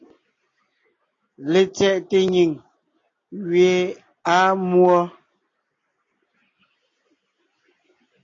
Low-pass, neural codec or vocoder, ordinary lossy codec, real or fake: 7.2 kHz; none; AAC, 32 kbps; real